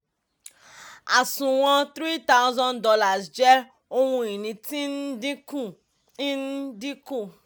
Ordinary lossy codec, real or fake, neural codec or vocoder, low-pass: none; real; none; none